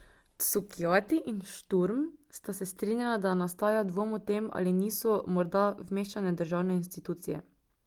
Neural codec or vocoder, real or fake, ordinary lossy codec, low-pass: none; real; Opus, 24 kbps; 19.8 kHz